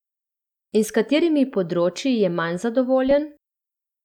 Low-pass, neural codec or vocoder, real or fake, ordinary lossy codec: 19.8 kHz; none; real; none